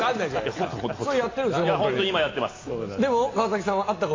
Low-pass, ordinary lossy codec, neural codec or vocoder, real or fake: 7.2 kHz; AAC, 32 kbps; none; real